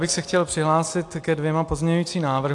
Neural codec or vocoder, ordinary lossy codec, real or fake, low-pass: none; AAC, 64 kbps; real; 10.8 kHz